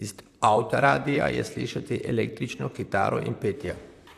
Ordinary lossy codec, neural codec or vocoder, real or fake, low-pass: AAC, 96 kbps; vocoder, 44.1 kHz, 128 mel bands, Pupu-Vocoder; fake; 14.4 kHz